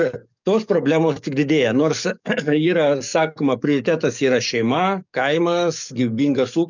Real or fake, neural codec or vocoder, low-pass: fake; codec, 44.1 kHz, 7.8 kbps, DAC; 7.2 kHz